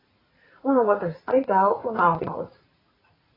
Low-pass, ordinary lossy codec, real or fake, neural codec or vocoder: 5.4 kHz; AAC, 24 kbps; fake; vocoder, 44.1 kHz, 80 mel bands, Vocos